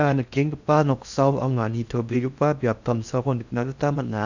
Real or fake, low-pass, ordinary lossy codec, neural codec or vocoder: fake; 7.2 kHz; none; codec, 16 kHz in and 24 kHz out, 0.6 kbps, FocalCodec, streaming, 4096 codes